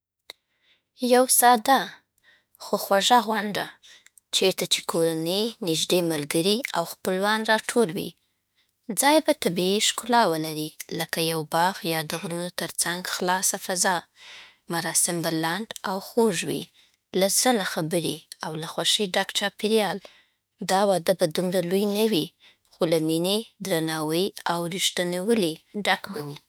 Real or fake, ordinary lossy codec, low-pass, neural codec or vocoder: fake; none; none; autoencoder, 48 kHz, 32 numbers a frame, DAC-VAE, trained on Japanese speech